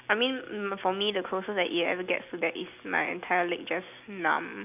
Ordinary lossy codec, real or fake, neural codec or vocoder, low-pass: none; real; none; 3.6 kHz